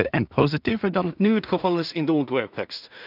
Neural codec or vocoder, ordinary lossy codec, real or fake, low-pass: codec, 16 kHz in and 24 kHz out, 0.4 kbps, LongCat-Audio-Codec, two codebook decoder; none; fake; 5.4 kHz